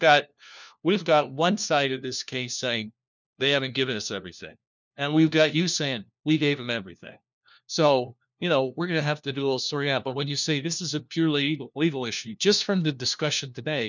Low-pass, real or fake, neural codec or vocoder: 7.2 kHz; fake; codec, 16 kHz, 1 kbps, FunCodec, trained on LibriTTS, 50 frames a second